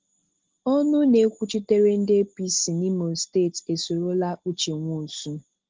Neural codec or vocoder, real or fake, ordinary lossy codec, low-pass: none; real; Opus, 16 kbps; 7.2 kHz